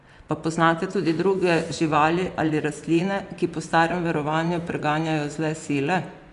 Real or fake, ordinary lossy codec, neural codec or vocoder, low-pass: fake; none; vocoder, 24 kHz, 100 mel bands, Vocos; 10.8 kHz